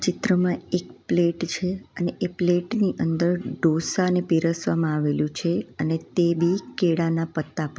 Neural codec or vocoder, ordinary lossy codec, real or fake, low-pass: none; none; real; none